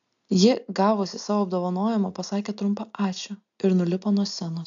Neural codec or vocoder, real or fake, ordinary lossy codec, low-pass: none; real; AAC, 64 kbps; 7.2 kHz